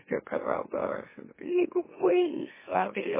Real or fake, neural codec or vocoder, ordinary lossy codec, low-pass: fake; autoencoder, 44.1 kHz, a latent of 192 numbers a frame, MeloTTS; MP3, 16 kbps; 3.6 kHz